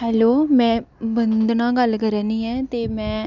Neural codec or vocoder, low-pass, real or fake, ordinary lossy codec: none; 7.2 kHz; real; none